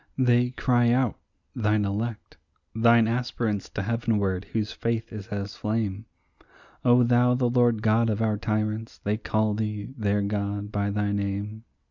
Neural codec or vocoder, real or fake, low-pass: none; real; 7.2 kHz